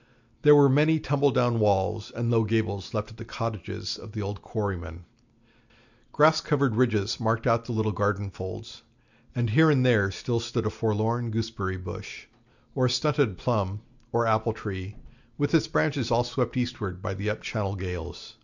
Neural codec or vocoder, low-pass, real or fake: none; 7.2 kHz; real